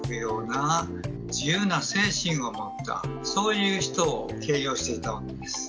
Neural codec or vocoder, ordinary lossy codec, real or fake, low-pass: none; none; real; none